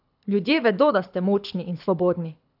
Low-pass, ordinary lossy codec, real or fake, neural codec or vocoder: 5.4 kHz; none; fake; codec, 24 kHz, 6 kbps, HILCodec